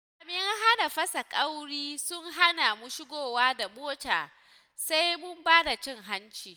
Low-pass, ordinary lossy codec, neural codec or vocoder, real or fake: none; none; none; real